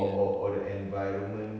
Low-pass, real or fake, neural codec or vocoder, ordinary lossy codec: none; real; none; none